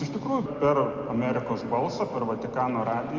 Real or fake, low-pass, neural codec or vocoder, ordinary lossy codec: real; 7.2 kHz; none; Opus, 32 kbps